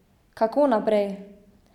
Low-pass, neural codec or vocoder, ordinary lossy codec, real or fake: 19.8 kHz; none; none; real